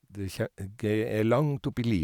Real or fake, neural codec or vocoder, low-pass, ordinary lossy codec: real; none; 19.8 kHz; none